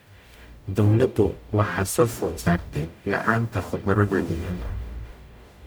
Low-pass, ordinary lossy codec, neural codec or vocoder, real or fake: none; none; codec, 44.1 kHz, 0.9 kbps, DAC; fake